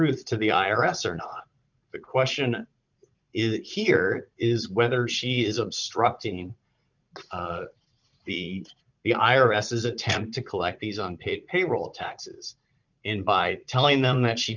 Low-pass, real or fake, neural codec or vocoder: 7.2 kHz; fake; vocoder, 44.1 kHz, 80 mel bands, Vocos